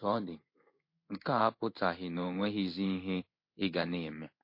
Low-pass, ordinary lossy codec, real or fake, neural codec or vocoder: 5.4 kHz; MP3, 32 kbps; fake; codec, 16 kHz in and 24 kHz out, 1 kbps, XY-Tokenizer